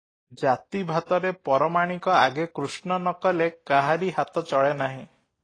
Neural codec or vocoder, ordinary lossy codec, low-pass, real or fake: none; AAC, 32 kbps; 9.9 kHz; real